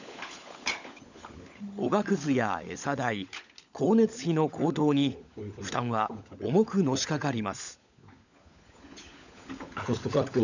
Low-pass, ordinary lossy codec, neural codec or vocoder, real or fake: 7.2 kHz; none; codec, 16 kHz, 16 kbps, FunCodec, trained on LibriTTS, 50 frames a second; fake